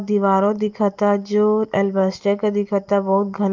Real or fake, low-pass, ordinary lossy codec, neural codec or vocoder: real; 7.2 kHz; Opus, 24 kbps; none